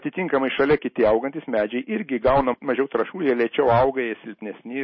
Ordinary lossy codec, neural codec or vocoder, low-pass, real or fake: MP3, 24 kbps; none; 7.2 kHz; real